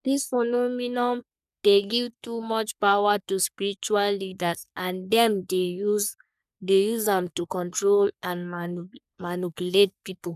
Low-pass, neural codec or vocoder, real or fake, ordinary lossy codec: 14.4 kHz; codec, 44.1 kHz, 3.4 kbps, Pupu-Codec; fake; none